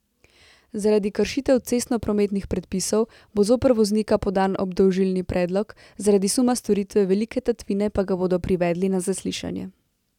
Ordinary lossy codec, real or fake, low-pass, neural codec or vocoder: none; real; 19.8 kHz; none